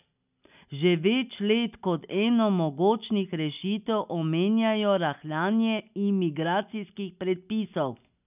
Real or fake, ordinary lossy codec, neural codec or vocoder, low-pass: real; none; none; 3.6 kHz